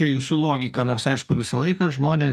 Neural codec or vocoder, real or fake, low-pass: codec, 44.1 kHz, 2.6 kbps, DAC; fake; 14.4 kHz